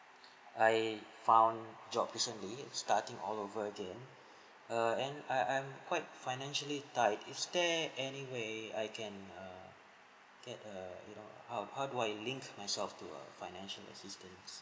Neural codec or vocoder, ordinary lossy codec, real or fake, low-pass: none; none; real; none